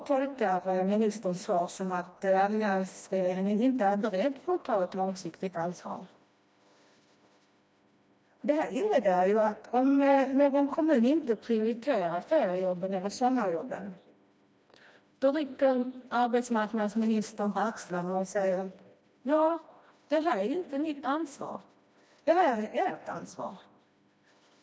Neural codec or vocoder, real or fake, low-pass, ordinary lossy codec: codec, 16 kHz, 1 kbps, FreqCodec, smaller model; fake; none; none